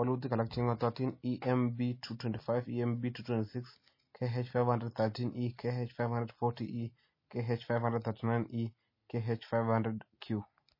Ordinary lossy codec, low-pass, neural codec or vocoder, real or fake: MP3, 24 kbps; 5.4 kHz; none; real